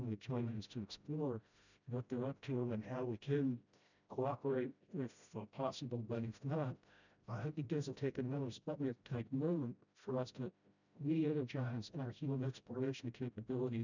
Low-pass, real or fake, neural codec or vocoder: 7.2 kHz; fake; codec, 16 kHz, 0.5 kbps, FreqCodec, smaller model